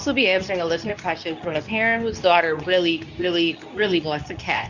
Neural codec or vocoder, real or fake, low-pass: codec, 24 kHz, 0.9 kbps, WavTokenizer, medium speech release version 2; fake; 7.2 kHz